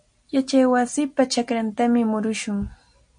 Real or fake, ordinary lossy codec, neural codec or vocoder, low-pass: real; MP3, 48 kbps; none; 9.9 kHz